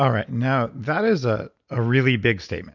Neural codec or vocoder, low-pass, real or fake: none; 7.2 kHz; real